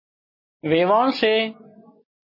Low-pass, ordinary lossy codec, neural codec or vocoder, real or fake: 5.4 kHz; MP3, 24 kbps; none; real